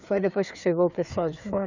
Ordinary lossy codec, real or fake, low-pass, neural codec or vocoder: none; fake; 7.2 kHz; codec, 16 kHz, 4 kbps, FreqCodec, larger model